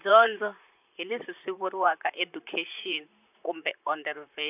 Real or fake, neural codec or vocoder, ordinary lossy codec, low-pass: fake; vocoder, 44.1 kHz, 128 mel bands, Pupu-Vocoder; none; 3.6 kHz